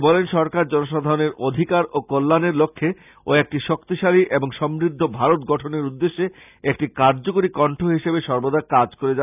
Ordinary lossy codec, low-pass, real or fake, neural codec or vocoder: none; 3.6 kHz; real; none